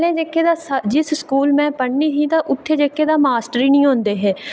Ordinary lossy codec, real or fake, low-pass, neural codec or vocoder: none; real; none; none